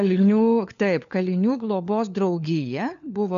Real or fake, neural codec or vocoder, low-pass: fake; codec, 16 kHz, 4 kbps, FunCodec, trained on LibriTTS, 50 frames a second; 7.2 kHz